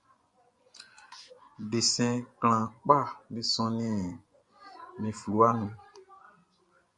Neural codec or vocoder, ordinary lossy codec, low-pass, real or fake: vocoder, 24 kHz, 100 mel bands, Vocos; MP3, 64 kbps; 10.8 kHz; fake